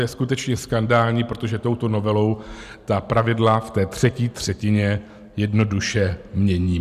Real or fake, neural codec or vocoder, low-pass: real; none; 14.4 kHz